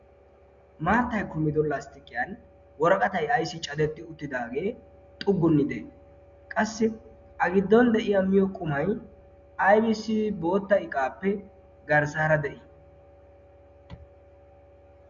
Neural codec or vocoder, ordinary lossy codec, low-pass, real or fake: none; Opus, 64 kbps; 7.2 kHz; real